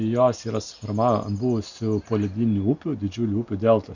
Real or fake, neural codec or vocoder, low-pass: real; none; 7.2 kHz